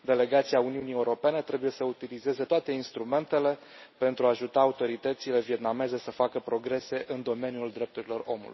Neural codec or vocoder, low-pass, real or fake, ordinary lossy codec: none; 7.2 kHz; real; MP3, 24 kbps